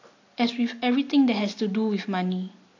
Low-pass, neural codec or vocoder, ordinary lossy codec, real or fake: 7.2 kHz; none; AAC, 48 kbps; real